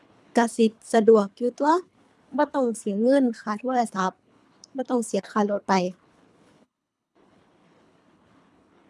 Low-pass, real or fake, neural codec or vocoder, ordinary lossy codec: none; fake; codec, 24 kHz, 3 kbps, HILCodec; none